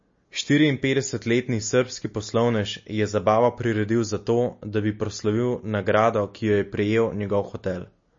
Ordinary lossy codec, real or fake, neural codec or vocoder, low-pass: MP3, 32 kbps; real; none; 7.2 kHz